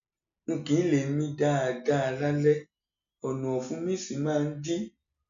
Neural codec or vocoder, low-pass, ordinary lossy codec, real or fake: none; 7.2 kHz; none; real